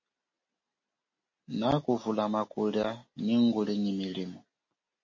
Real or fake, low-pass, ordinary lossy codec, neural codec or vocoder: real; 7.2 kHz; MP3, 32 kbps; none